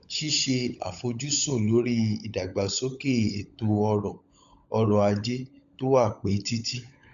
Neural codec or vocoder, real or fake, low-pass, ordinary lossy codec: codec, 16 kHz, 16 kbps, FunCodec, trained on LibriTTS, 50 frames a second; fake; 7.2 kHz; none